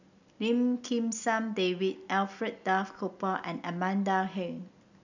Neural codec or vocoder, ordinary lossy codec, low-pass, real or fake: none; none; 7.2 kHz; real